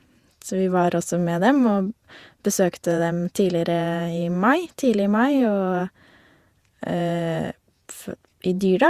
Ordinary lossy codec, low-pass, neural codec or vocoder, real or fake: Opus, 64 kbps; 14.4 kHz; vocoder, 48 kHz, 128 mel bands, Vocos; fake